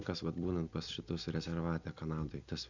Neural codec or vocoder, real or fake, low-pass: none; real; 7.2 kHz